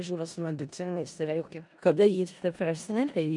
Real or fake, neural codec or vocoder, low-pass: fake; codec, 16 kHz in and 24 kHz out, 0.4 kbps, LongCat-Audio-Codec, four codebook decoder; 10.8 kHz